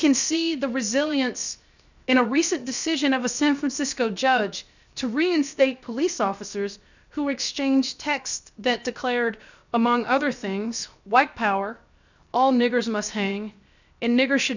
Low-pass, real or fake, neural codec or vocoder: 7.2 kHz; fake; codec, 16 kHz, 0.7 kbps, FocalCodec